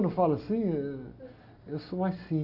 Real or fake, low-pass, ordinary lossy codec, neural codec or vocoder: real; 5.4 kHz; AAC, 48 kbps; none